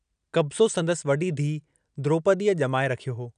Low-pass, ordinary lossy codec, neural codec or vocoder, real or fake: 9.9 kHz; none; none; real